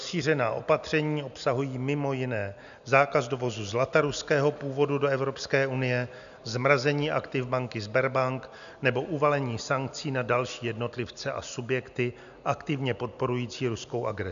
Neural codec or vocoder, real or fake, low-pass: none; real; 7.2 kHz